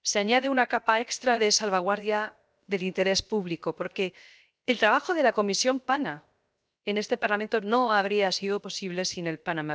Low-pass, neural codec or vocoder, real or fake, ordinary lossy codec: none; codec, 16 kHz, 0.7 kbps, FocalCodec; fake; none